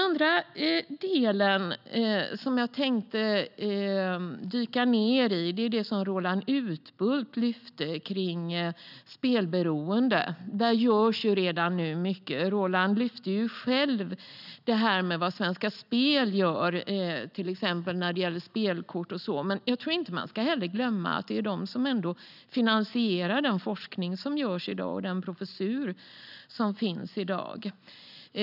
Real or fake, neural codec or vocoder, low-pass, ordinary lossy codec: real; none; 5.4 kHz; none